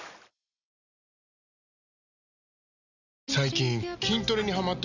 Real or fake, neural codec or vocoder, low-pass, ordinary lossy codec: real; none; 7.2 kHz; none